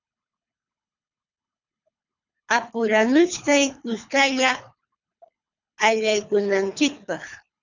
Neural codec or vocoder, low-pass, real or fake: codec, 24 kHz, 3 kbps, HILCodec; 7.2 kHz; fake